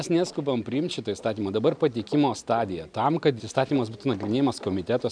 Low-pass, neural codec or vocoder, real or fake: 9.9 kHz; none; real